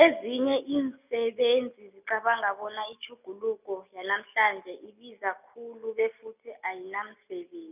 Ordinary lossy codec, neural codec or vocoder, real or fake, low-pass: none; none; real; 3.6 kHz